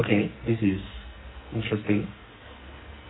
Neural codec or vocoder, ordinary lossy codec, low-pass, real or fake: codec, 44.1 kHz, 2.6 kbps, SNAC; AAC, 16 kbps; 7.2 kHz; fake